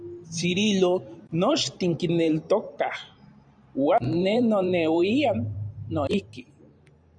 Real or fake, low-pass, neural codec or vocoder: fake; 9.9 kHz; vocoder, 44.1 kHz, 128 mel bands every 512 samples, BigVGAN v2